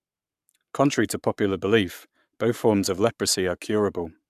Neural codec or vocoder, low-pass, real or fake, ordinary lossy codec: codec, 44.1 kHz, 7.8 kbps, Pupu-Codec; 14.4 kHz; fake; none